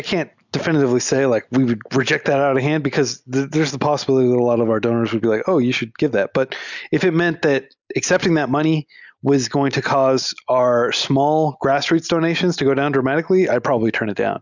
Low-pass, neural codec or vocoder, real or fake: 7.2 kHz; none; real